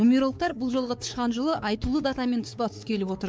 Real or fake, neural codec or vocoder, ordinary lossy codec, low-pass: fake; codec, 16 kHz, 4 kbps, X-Codec, WavLM features, trained on Multilingual LibriSpeech; none; none